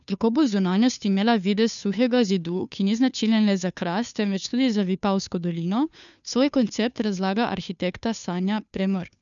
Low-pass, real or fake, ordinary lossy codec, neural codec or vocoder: 7.2 kHz; fake; none; codec, 16 kHz, 2 kbps, FunCodec, trained on Chinese and English, 25 frames a second